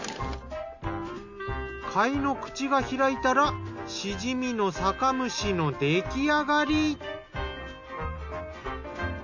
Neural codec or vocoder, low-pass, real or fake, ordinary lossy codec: none; 7.2 kHz; real; none